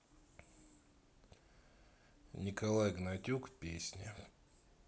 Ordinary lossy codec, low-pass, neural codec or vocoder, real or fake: none; none; none; real